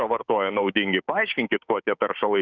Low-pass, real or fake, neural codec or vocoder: 7.2 kHz; fake; codec, 16 kHz, 6 kbps, DAC